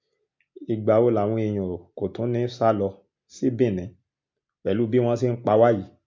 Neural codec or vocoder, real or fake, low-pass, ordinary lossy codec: none; real; 7.2 kHz; MP3, 48 kbps